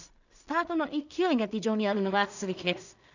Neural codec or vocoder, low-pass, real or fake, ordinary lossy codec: codec, 16 kHz in and 24 kHz out, 0.4 kbps, LongCat-Audio-Codec, two codebook decoder; 7.2 kHz; fake; none